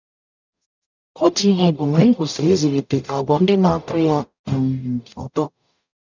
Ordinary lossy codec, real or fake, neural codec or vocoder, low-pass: none; fake; codec, 44.1 kHz, 0.9 kbps, DAC; 7.2 kHz